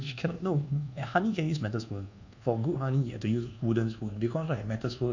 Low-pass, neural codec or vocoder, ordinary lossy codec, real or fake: 7.2 kHz; codec, 24 kHz, 1.2 kbps, DualCodec; none; fake